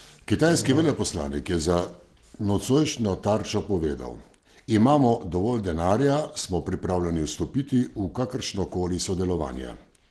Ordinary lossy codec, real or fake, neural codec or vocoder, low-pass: Opus, 16 kbps; real; none; 10.8 kHz